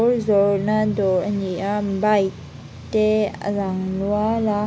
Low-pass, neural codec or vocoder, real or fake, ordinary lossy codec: none; none; real; none